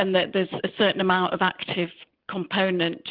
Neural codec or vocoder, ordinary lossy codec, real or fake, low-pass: none; Opus, 24 kbps; real; 5.4 kHz